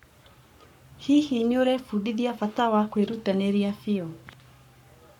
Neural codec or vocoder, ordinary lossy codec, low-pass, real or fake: codec, 44.1 kHz, 7.8 kbps, Pupu-Codec; none; 19.8 kHz; fake